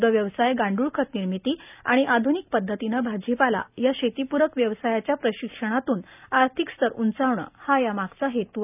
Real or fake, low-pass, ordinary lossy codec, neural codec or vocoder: real; 3.6 kHz; none; none